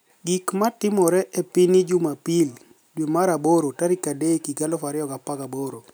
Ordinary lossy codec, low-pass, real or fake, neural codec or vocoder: none; none; real; none